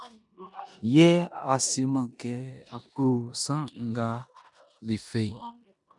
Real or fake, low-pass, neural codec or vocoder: fake; 10.8 kHz; codec, 16 kHz in and 24 kHz out, 0.9 kbps, LongCat-Audio-Codec, four codebook decoder